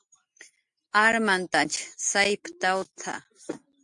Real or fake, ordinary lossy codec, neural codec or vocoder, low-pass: real; MP3, 64 kbps; none; 10.8 kHz